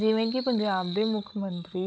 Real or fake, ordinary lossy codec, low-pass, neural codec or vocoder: fake; none; none; codec, 16 kHz, 16 kbps, FunCodec, trained on Chinese and English, 50 frames a second